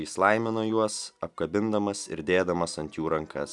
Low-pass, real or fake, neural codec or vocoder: 10.8 kHz; real; none